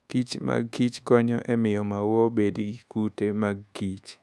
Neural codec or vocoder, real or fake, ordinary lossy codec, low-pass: codec, 24 kHz, 1.2 kbps, DualCodec; fake; none; none